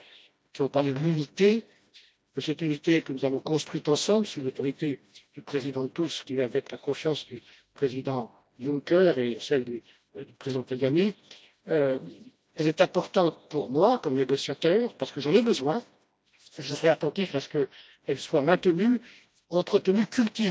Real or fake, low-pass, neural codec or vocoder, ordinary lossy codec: fake; none; codec, 16 kHz, 1 kbps, FreqCodec, smaller model; none